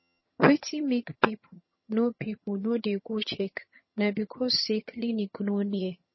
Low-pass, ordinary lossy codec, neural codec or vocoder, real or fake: 7.2 kHz; MP3, 24 kbps; vocoder, 22.05 kHz, 80 mel bands, HiFi-GAN; fake